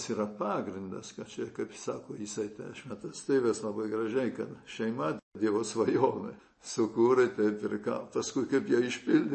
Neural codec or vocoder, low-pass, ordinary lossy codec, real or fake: none; 10.8 kHz; MP3, 32 kbps; real